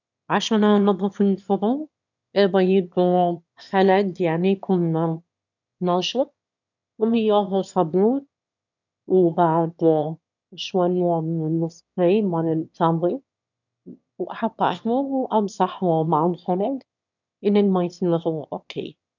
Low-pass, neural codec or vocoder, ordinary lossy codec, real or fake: 7.2 kHz; autoencoder, 22.05 kHz, a latent of 192 numbers a frame, VITS, trained on one speaker; none; fake